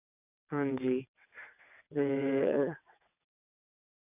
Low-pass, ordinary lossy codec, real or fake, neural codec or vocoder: 3.6 kHz; none; fake; vocoder, 22.05 kHz, 80 mel bands, WaveNeXt